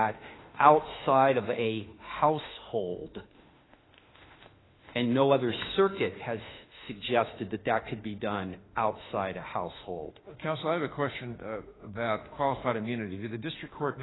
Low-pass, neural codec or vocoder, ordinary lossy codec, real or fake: 7.2 kHz; autoencoder, 48 kHz, 32 numbers a frame, DAC-VAE, trained on Japanese speech; AAC, 16 kbps; fake